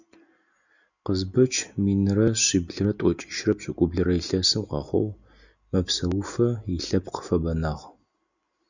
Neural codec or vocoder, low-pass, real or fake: none; 7.2 kHz; real